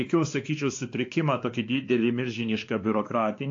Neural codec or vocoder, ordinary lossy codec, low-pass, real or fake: codec, 16 kHz, 2 kbps, X-Codec, WavLM features, trained on Multilingual LibriSpeech; AAC, 48 kbps; 7.2 kHz; fake